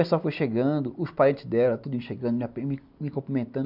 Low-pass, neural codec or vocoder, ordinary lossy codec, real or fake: 5.4 kHz; none; none; real